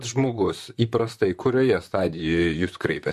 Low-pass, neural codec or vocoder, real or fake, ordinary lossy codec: 14.4 kHz; vocoder, 44.1 kHz, 128 mel bands, Pupu-Vocoder; fake; MP3, 64 kbps